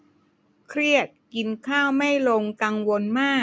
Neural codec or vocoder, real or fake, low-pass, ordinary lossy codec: none; real; none; none